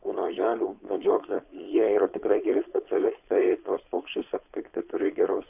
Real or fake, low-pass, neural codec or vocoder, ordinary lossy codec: fake; 3.6 kHz; codec, 16 kHz, 4.8 kbps, FACodec; AAC, 32 kbps